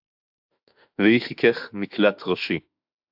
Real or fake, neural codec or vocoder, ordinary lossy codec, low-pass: fake; autoencoder, 48 kHz, 32 numbers a frame, DAC-VAE, trained on Japanese speech; AAC, 48 kbps; 5.4 kHz